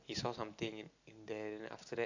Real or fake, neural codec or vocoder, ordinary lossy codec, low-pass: real; none; AAC, 48 kbps; 7.2 kHz